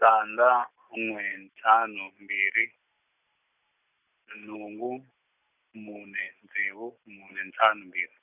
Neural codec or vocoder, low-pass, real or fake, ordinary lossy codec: none; 3.6 kHz; real; none